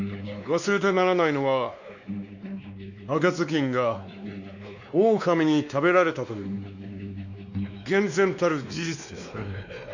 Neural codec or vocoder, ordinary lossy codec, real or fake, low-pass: codec, 16 kHz, 2 kbps, X-Codec, WavLM features, trained on Multilingual LibriSpeech; none; fake; 7.2 kHz